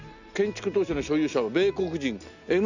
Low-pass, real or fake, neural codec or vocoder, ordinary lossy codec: 7.2 kHz; real; none; none